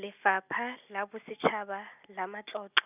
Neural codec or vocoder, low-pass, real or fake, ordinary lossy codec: none; 3.6 kHz; real; none